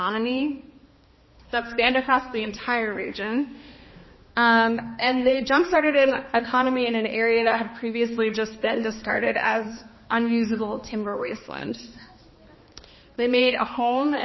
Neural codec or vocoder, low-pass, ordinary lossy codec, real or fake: codec, 16 kHz, 2 kbps, X-Codec, HuBERT features, trained on balanced general audio; 7.2 kHz; MP3, 24 kbps; fake